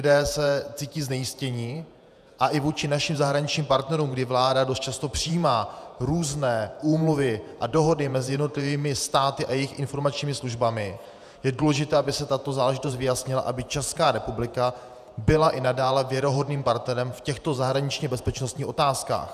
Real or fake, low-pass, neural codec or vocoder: fake; 14.4 kHz; vocoder, 48 kHz, 128 mel bands, Vocos